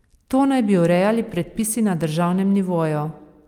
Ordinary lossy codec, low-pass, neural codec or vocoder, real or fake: Opus, 32 kbps; 19.8 kHz; none; real